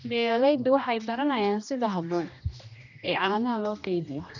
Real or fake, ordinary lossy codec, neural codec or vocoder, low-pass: fake; none; codec, 16 kHz, 1 kbps, X-Codec, HuBERT features, trained on general audio; 7.2 kHz